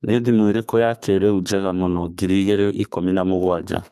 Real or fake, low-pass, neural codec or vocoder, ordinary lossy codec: fake; 14.4 kHz; codec, 32 kHz, 1.9 kbps, SNAC; none